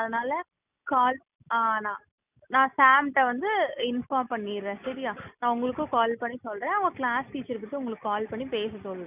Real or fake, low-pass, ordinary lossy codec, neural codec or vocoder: real; 3.6 kHz; none; none